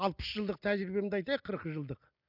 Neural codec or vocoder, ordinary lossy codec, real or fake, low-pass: none; none; real; 5.4 kHz